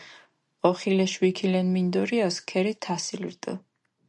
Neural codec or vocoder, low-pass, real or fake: none; 10.8 kHz; real